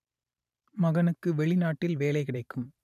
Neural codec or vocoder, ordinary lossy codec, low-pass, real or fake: vocoder, 44.1 kHz, 128 mel bands every 512 samples, BigVGAN v2; none; 14.4 kHz; fake